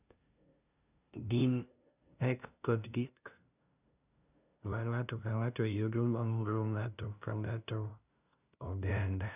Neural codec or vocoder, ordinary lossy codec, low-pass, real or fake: codec, 16 kHz, 0.5 kbps, FunCodec, trained on LibriTTS, 25 frames a second; none; 3.6 kHz; fake